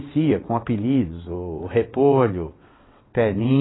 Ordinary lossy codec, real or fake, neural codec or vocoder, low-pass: AAC, 16 kbps; fake; vocoder, 22.05 kHz, 80 mel bands, WaveNeXt; 7.2 kHz